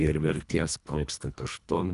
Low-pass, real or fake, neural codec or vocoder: 10.8 kHz; fake; codec, 24 kHz, 1.5 kbps, HILCodec